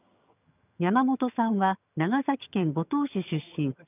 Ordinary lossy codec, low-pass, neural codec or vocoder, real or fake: none; 3.6 kHz; vocoder, 22.05 kHz, 80 mel bands, HiFi-GAN; fake